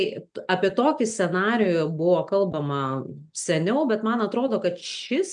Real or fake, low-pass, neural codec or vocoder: real; 9.9 kHz; none